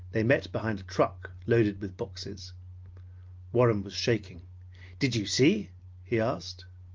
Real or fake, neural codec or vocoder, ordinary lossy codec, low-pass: real; none; Opus, 32 kbps; 7.2 kHz